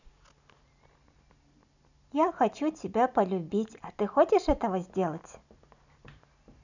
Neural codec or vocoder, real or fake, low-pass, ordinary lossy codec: none; real; 7.2 kHz; none